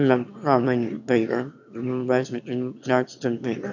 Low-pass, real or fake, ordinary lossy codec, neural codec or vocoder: 7.2 kHz; fake; none; autoencoder, 22.05 kHz, a latent of 192 numbers a frame, VITS, trained on one speaker